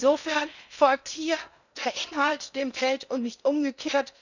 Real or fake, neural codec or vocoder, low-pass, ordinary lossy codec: fake; codec, 16 kHz in and 24 kHz out, 0.8 kbps, FocalCodec, streaming, 65536 codes; 7.2 kHz; none